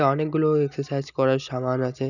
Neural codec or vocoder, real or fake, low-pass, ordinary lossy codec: none; real; 7.2 kHz; none